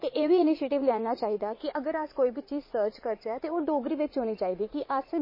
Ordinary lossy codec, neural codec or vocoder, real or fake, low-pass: MP3, 24 kbps; vocoder, 44.1 kHz, 80 mel bands, Vocos; fake; 5.4 kHz